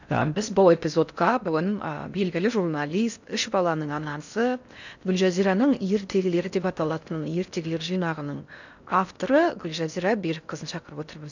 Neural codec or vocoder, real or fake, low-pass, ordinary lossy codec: codec, 16 kHz in and 24 kHz out, 0.6 kbps, FocalCodec, streaming, 4096 codes; fake; 7.2 kHz; none